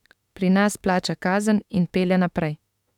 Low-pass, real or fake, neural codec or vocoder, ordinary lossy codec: 19.8 kHz; fake; autoencoder, 48 kHz, 32 numbers a frame, DAC-VAE, trained on Japanese speech; none